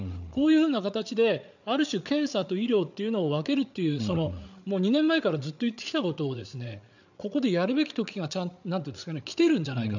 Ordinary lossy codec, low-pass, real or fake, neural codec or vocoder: none; 7.2 kHz; fake; codec, 16 kHz, 8 kbps, FreqCodec, larger model